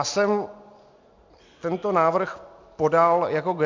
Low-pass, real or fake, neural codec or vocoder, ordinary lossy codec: 7.2 kHz; real; none; MP3, 64 kbps